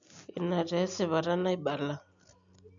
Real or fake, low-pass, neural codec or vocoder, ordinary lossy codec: real; 7.2 kHz; none; none